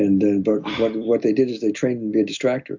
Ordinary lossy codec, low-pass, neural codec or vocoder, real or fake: MP3, 64 kbps; 7.2 kHz; none; real